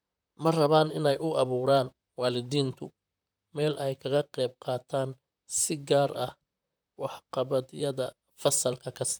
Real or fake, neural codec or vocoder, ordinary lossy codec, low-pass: fake; vocoder, 44.1 kHz, 128 mel bands, Pupu-Vocoder; none; none